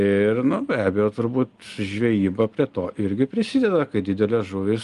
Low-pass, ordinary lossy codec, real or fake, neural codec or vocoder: 10.8 kHz; Opus, 32 kbps; real; none